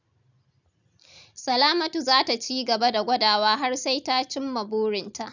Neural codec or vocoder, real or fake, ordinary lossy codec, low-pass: none; real; none; 7.2 kHz